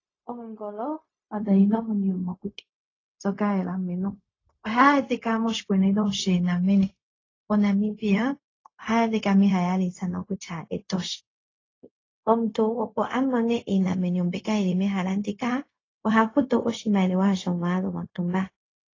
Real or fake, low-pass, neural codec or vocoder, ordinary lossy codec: fake; 7.2 kHz; codec, 16 kHz, 0.4 kbps, LongCat-Audio-Codec; AAC, 32 kbps